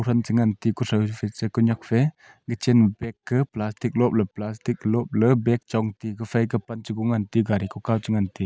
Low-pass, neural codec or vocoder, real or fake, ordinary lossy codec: none; none; real; none